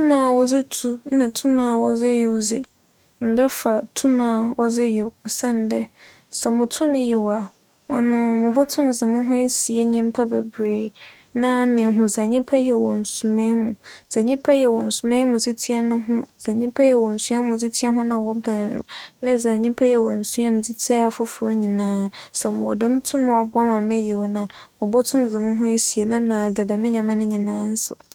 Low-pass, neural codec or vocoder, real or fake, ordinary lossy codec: 19.8 kHz; codec, 44.1 kHz, 2.6 kbps, DAC; fake; none